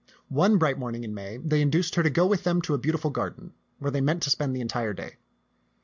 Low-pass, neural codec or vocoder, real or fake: 7.2 kHz; none; real